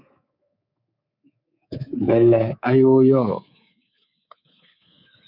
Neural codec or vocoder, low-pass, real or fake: codec, 24 kHz, 3.1 kbps, DualCodec; 5.4 kHz; fake